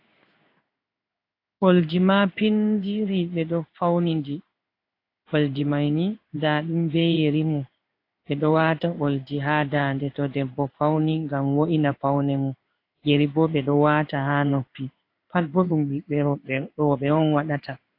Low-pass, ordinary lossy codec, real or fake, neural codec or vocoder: 5.4 kHz; AAC, 32 kbps; fake; codec, 16 kHz in and 24 kHz out, 1 kbps, XY-Tokenizer